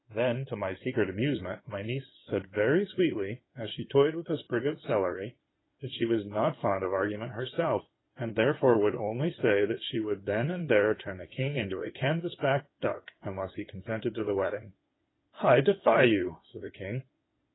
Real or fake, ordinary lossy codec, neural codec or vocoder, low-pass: fake; AAC, 16 kbps; codec, 16 kHz in and 24 kHz out, 2.2 kbps, FireRedTTS-2 codec; 7.2 kHz